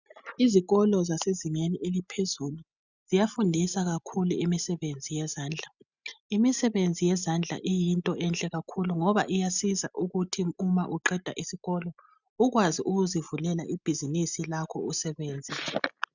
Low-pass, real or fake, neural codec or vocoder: 7.2 kHz; real; none